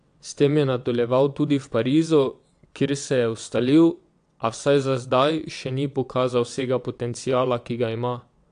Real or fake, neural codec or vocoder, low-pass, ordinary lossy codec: fake; vocoder, 22.05 kHz, 80 mel bands, WaveNeXt; 9.9 kHz; AAC, 64 kbps